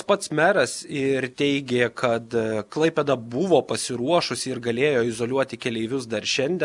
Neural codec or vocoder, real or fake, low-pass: none; real; 10.8 kHz